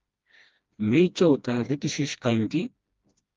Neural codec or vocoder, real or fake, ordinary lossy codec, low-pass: codec, 16 kHz, 1 kbps, FreqCodec, smaller model; fake; Opus, 32 kbps; 7.2 kHz